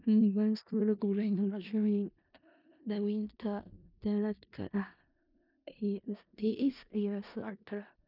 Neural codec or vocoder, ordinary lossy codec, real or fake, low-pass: codec, 16 kHz in and 24 kHz out, 0.4 kbps, LongCat-Audio-Codec, four codebook decoder; none; fake; 5.4 kHz